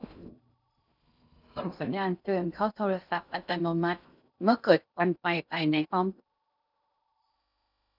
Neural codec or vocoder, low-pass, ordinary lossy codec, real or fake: codec, 16 kHz in and 24 kHz out, 0.6 kbps, FocalCodec, streaming, 2048 codes; 5.4 kHz; none; fake